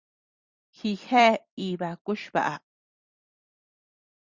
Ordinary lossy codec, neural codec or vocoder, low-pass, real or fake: Opus, 64 kbps; vocoder, 44.1 kHz, 128 mel bands every 256 samples, BigVGAN v2; 7.2 kHz; fake